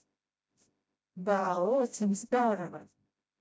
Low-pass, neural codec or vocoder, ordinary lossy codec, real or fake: none; codec, 16 kHz, 0.5 kbps, FreqCodec, smaller model; none; fake